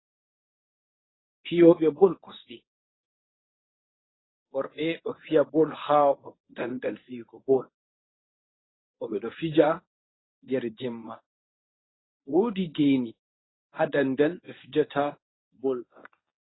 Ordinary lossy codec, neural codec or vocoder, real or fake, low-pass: AAC, 16 kbps; codec, 24 kHz, 0.9 kbps, WavTokenizer, medium speech release version 1; fake; 7.2 kHz